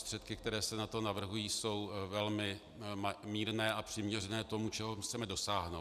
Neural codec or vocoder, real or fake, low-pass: none; real; 14.4 kHz